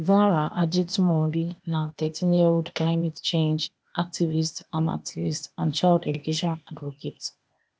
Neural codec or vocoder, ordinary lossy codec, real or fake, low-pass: codec, 16 kHz, 0.8 kbps, ZipCodec; none; fake; none